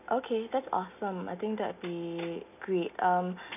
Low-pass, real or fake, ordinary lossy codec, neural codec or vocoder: 3.6 kHz; real; none; none